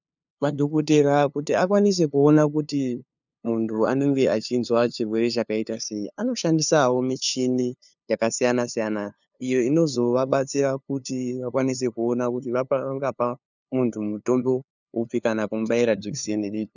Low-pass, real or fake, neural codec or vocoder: 7.2 kHz; fake; codec, 16 kHz, 2 kbps, FunCodec, trained on LibriTTS, 25 frames a second